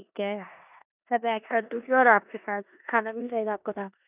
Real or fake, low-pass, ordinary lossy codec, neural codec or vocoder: fake; 3.6 kHz; none; codec, 16 kHz in and 24 kHz out, 0.9 kbps, LongCat-Audio-Codec, four codebook decoder